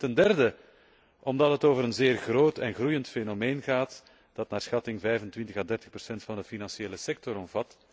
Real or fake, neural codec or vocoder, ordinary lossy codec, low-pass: real; none; none; none